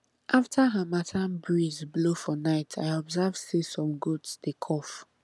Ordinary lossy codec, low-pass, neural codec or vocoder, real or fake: none; none; none; real